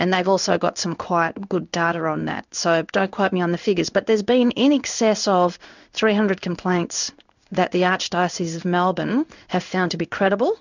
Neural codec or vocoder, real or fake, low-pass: codec, 16 kHz in and 24 kHz out, 1 kbps, XY-Tokenizer; fake; 7.2 kHz